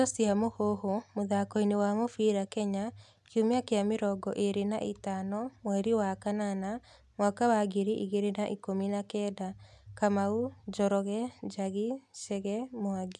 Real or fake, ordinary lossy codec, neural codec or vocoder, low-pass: real; none; none; none